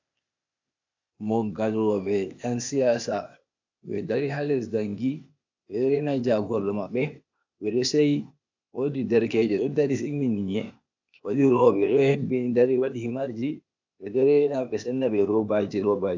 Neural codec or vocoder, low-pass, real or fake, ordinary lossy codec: codec, 16 kHz, 0.8 kbps, ZipCodec; 7.2 kHz; fake; AAC, 48 kbps